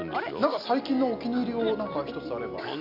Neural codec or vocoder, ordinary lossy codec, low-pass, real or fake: none; none; 5.4 kHz; real